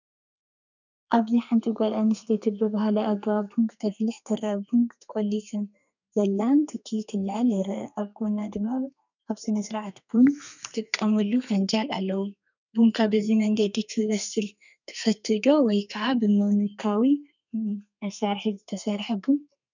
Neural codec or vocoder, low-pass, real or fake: codec, 32 kHz, 1.9 kbps, SNAC; 7.2 kHz; fake